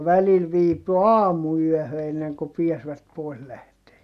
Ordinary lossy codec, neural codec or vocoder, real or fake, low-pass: none; none; real; 14.4 kHz